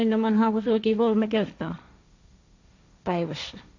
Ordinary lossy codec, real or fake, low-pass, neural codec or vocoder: AAC, 48 kbps; fake; 7.2 kHz; codec, 16 kHz, 1.1 kbps, Voila-Tokenizer